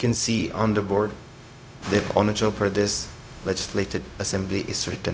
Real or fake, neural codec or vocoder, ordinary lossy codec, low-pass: fake; codec, 16 kHz, 0.4 kbps, LongCat-Audio-Codec; none; none